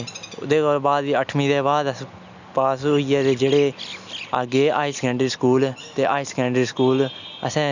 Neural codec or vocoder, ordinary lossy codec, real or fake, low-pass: none; none; real; 7.2 kHz